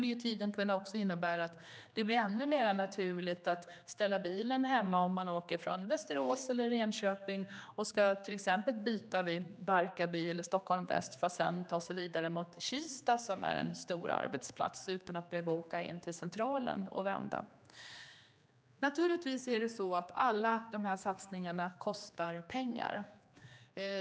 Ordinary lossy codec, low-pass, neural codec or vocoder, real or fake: none; none; codec, 16 kHz, 2 kbps, X-Codec, HuBERT features, trained on general audio; fake